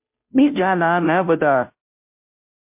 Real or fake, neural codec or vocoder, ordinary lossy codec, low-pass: fake; codec, 16 kHz, 0.5 kbps, FunCodec, trained on Chinese and English, 25 frames a second; MP3, 32 kbps; 3.6 kHz